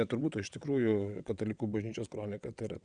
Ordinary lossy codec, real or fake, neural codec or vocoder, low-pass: Opus, 64 kbps; fake; vocoder, 22.05 kHz, 80 mel bands, WaveNeXt; 9.9 kHz